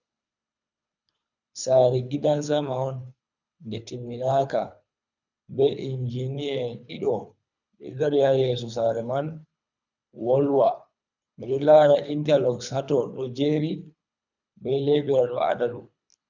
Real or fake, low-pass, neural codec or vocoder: fake; 7.2 kHz; codec, 24 kHz, 3 kbps, HILCodec